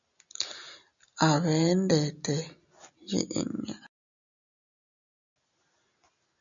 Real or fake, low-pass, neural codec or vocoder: real; 7.2 kHz; none